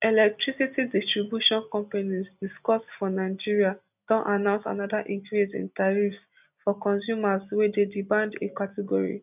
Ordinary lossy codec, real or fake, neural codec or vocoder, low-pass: none; real; none; 3.6 kHz